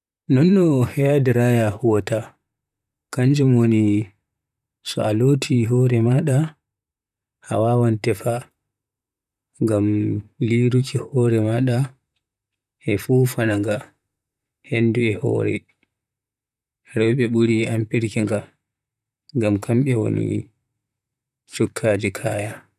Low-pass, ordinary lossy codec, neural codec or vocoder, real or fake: 14.4 kHz; none; vocoder, 44.1 kHz, 128 mel bands, Pupu-Vocoder; fake